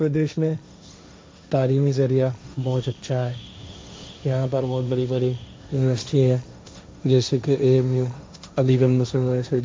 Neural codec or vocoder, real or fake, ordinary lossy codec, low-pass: codec, 16 kHz, 1.1 kbps, Voila-Tokenizer; fake; none; none